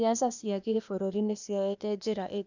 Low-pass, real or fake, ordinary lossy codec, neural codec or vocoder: 7.2 kHz; fake; none; codec, 16 kHz, 0.8 kbps, ZipCodec